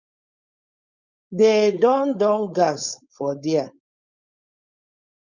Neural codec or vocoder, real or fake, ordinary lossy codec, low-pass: codec, 16 kHz, 4.8 kbps, FACodec; fake; Opus, 64 kbps; 7.2 kHz